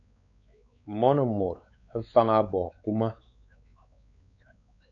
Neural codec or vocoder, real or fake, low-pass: codec, 16 kHz, 4 kbps, X-Codec, WavLM features, trained on Multilingual LibriSpeech; fake; 7.2 kHz